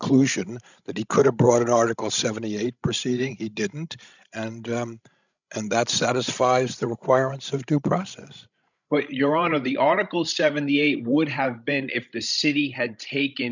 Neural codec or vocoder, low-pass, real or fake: codec, 16 kHz, 16 kbps, FreqCodec, larger model; 7.2 kHz; fake